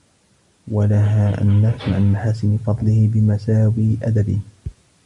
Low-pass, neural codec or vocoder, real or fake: 10.8 kHz; none; real